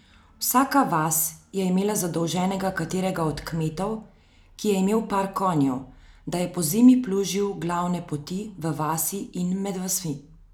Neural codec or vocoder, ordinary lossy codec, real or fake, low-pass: none; none; real; none